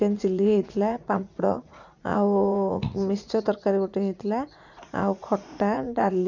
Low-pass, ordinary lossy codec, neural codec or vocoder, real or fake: 7.2 kHz; none; vocoder, 44.1 kHz, 80 mel bands, Vocos; fake